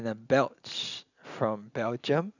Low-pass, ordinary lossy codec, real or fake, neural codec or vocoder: 7.2 kHz; none; real; none